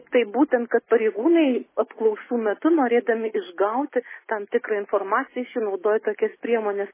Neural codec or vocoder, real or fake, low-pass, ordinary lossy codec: none; real; 3.6 kHz; MP3, 16 kbps